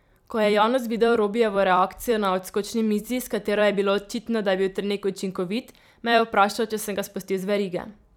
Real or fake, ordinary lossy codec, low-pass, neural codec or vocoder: fake; none; 19.8 kHz; vocoder, 44.1 kHz, 128 mel bands every 256 samples, BigVGAN v2